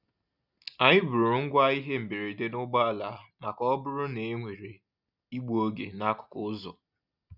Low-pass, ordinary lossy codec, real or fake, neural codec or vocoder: 5.4 kHz; none; real; none